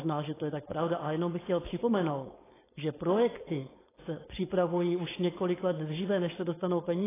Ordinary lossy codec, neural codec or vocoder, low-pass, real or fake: AAC, 16 kbps; codec, 16 kHz, 4.8 kbps, FACodec; 3.6 kHz; fake